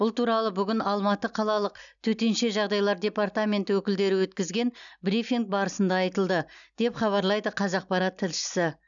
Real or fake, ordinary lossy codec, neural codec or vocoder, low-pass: real; none; none; 7.2 kHz